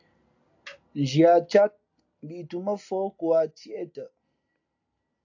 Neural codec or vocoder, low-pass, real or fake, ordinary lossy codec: none; 7.2 kHz; real; AAC, 48 kbps